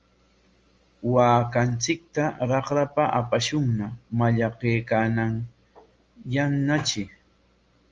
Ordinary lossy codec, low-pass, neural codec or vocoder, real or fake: Opus, 32 kbps; 7.2 kHz; none; real